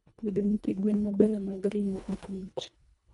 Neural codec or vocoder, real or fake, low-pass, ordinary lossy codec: codec, 24 kHz, 1.5 kbps, HILCodec; fake; 10.8 kHz; none